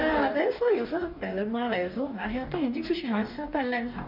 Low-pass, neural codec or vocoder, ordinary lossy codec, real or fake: 5.4 kHz; codec, 44.1 kHz, 2.6 kbps, DAC; MP3, 48 kbps; fake